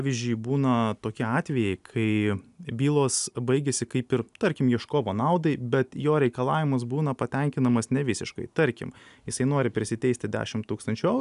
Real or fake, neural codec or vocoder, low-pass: real; none; 10.8 kHz